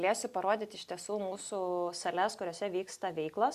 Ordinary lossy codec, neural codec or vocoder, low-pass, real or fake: Opus, 64 kbps; none; 14.4 kHz; real